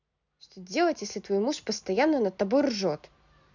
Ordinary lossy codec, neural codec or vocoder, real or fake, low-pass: none; none; real; 7.2 kHz